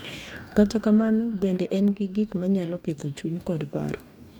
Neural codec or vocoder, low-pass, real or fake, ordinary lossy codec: codec, 44.1 kHz, 2.6 kbps, DAC; 19.8 kHz; fake; none